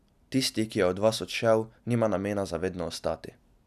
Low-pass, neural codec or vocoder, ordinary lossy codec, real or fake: 14.4 kHz; none; none; real